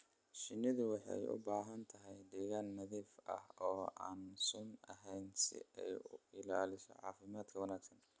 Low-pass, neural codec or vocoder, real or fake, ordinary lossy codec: none; none; real; none